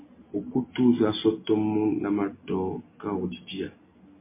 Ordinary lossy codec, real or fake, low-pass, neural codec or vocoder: MP3, 24 kbps; real; 3.6 kHz; none